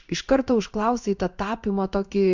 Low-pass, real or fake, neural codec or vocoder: 7.2 kHz; fake; codec, 16 kHz in and 24 kHz out, 1 kbps, XY-Tokenizer